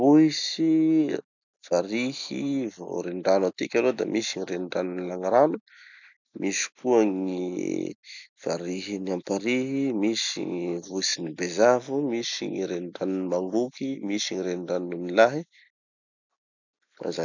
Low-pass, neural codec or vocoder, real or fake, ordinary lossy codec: 7.2 kHz; none; real; none